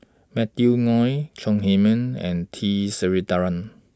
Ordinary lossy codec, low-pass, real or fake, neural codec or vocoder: none; none; real; none